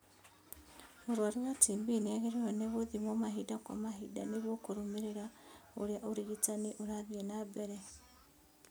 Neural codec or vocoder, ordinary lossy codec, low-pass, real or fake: none; none; none; real